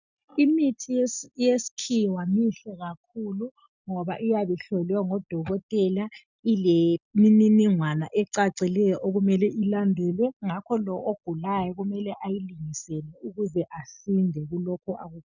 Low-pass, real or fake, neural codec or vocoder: 7.2 kHz; real; none